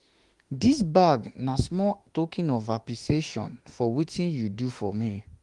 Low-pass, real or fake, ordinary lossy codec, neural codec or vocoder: 10.8 kHz; fake; Opus, 24 kbps; autoencoder, 48 kHz, 32 numbers a frame, DAC-VAE, trained on Japanese speech